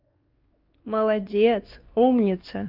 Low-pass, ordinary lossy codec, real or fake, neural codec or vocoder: 5.4 kHz; Opus, 32 kbps; fake; codec, 16 kHz, 2 kbps, X-Codec, WavLM features, trained on Multilingual LibriSpeech